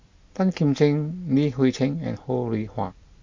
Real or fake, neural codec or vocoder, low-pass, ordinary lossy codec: real; none; 7.2 kHz; MP3, 48 kbps